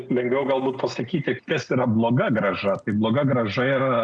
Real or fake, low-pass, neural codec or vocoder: real; 9.9 kHz; none